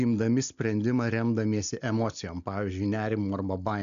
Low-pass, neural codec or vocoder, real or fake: 7.2 kHz; none; real